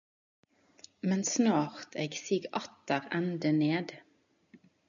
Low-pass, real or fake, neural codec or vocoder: 7.2 kHz; real; none